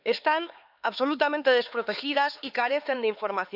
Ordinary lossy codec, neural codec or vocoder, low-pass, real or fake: AAC, 48 kbps; codec, 16 kHz, 4 kbps, X-Codec, HuBERT features, trained on LibriSpeech; 5.4 kHz; fake